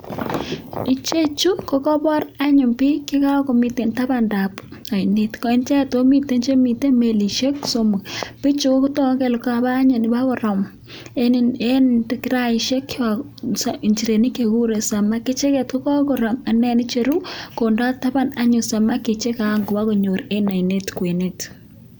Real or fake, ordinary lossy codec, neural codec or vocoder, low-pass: real; none; none; none